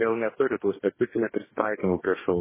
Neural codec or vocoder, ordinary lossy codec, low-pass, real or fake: codec, 44.1 kHz, 2.6 kbps, DAC; MP3, 16 kbps; 3.6 kHz; fake